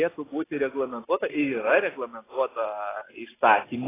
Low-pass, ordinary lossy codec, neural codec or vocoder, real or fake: 3.6 kHz; AAC, 16 kbps; none; real